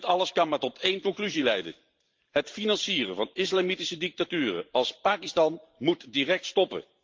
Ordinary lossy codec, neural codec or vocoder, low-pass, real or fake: Opus, 32 kbps; vocoder, 44.1 kHz, 128 mel bands every 512 samples, BigVGAN v2; 7.2 kHz; fake